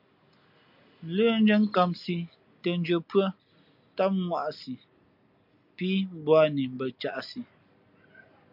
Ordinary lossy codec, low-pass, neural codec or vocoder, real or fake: MP3, 48 kbps; 5.4 kHz; none; real